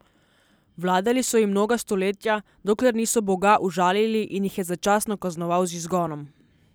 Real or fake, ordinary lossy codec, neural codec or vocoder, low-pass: real; none; none; none